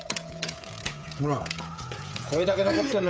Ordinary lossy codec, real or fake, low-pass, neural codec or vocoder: none; fake; none; codec, 16 kHz, 16 kbps, FreqCodec, smaller model